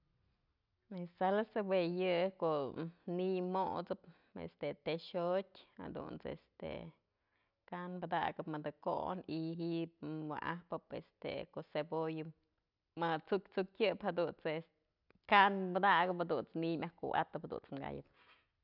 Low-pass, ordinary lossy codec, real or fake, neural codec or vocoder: 5.4 kHz; none; real; none